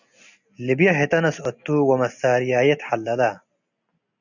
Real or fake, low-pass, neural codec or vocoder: real; 7.2 kHz; none